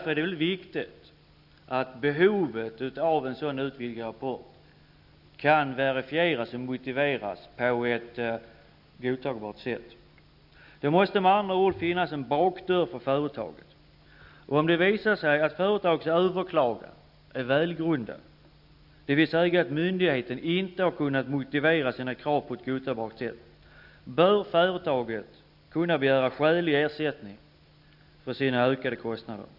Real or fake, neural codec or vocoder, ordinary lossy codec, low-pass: real; none; none; 5.4 kHz